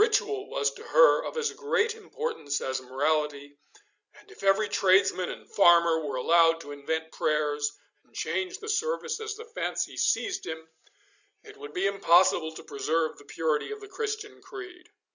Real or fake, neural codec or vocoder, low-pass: real; none; 7.2 kHz